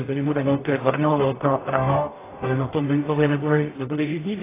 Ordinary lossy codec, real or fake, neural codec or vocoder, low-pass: AAC, 16 kbps; fake; codec, 44.1 kHz, 0.9 kbps, DAC; 3.6 kHz